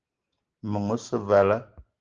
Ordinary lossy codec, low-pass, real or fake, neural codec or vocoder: Opus, 16 kbps; 7.2 kHz; real; none